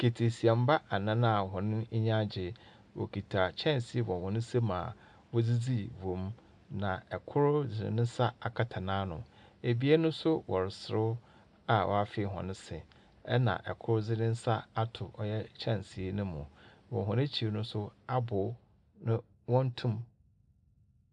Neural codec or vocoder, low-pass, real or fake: none; 10.8 kHz; real